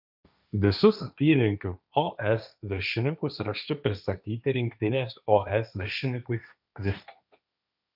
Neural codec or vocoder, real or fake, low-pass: codec, 16 kHz, 1.1 kbps, Voila-Tokenizer; fake; 5.4 kHz